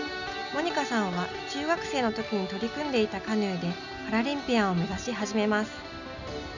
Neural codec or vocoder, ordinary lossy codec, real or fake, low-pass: none; none; real; 7.2 kHz